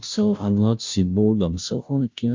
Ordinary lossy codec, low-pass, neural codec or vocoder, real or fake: MP3, 64 kbps; 7.2 kHz; codec, 16 kHz, 0.5 kbps, FunCodec, trained on Chinese and English, 25 frames a second; fake